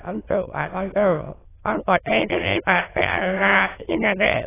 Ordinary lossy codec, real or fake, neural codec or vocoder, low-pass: AAC, 16 kbps; fake; autoencoder, 22.05 kHz, a latent of 192 numbers a frame, VITS, trained on many speakers; 3.6 kHz